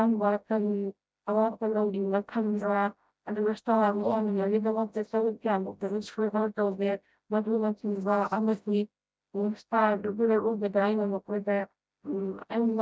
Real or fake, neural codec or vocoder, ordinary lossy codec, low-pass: fake; codec, 16 kHz, 0.5 kbps, FreqCodec, smaller model; none; none